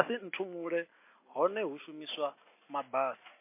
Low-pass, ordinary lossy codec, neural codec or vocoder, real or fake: 3.6 kHz; MP3, 24 kbps; none; real